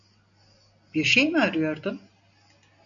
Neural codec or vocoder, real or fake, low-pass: none; real; 7.2 kHz